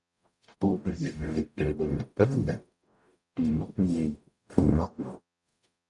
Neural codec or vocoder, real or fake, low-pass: codec, 44.1 kHz, 0.9 kbps, DAC; fake; 10.8 kHz